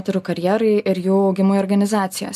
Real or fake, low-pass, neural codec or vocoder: real; 14.4 kHz; none